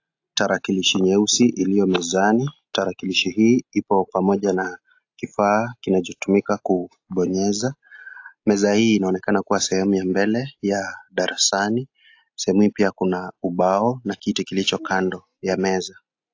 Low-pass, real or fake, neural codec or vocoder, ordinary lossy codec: 7.2 kHz; real; none; AAC, 48 kbps